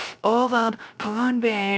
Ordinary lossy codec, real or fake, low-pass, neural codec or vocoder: none; fake; none; codec, 16 kHz, 0.3 kbps, FocalCodec